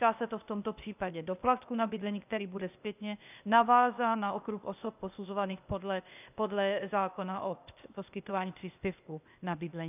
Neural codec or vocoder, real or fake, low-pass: codec, 16 kHz, 0.8 kbps, ZipCodec; fake; 3.6 kHz